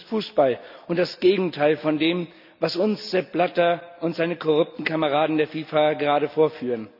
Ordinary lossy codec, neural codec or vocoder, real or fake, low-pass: none; none; real; 5.4 kHz